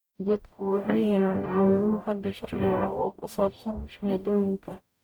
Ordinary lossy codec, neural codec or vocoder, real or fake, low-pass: none; codec, 44.1 kHz, 0.9 kbps, DAC; fake; none